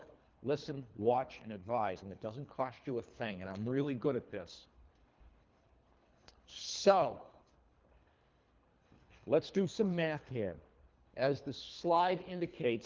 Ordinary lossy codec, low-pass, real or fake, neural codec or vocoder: Opus, 32 kbps; 7.2 kHz; fake; codec, 24 kHz, 3 kbps, HILCodec